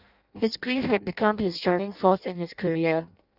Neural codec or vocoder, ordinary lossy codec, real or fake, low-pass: codec, 16 kHz in and 24 kHz out, 0.6 kbps, FireRedTTS-2 codec; none; fake; 5.4 kHz